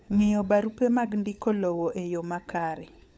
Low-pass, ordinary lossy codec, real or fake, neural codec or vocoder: none; none; fake; codec, 16 kHz, 16 kbps, FunCodec, trained on LibriTTS, 50 frames a second